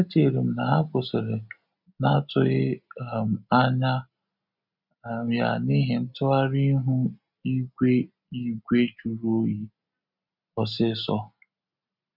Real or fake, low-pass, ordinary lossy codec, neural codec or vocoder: real; 5.4 kHz; none; none